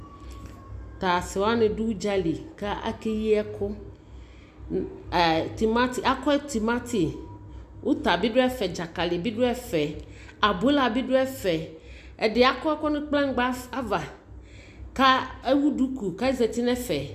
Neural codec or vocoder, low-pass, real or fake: none; 14.4 kHz; real